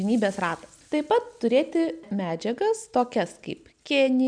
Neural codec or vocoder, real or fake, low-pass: none; real; 9.9 kHz